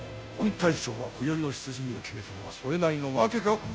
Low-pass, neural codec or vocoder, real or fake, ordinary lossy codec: none; codec, 16 kHz, 0.5 kbps, FunCodec, trained on Chinese and English, 25 frames a second; fake; none